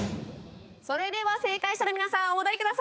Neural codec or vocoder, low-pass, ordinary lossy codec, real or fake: codec, 16 kHz, 4 kbps, X-Codec, HuBERT features, trained on balanced general audio; none; none; fake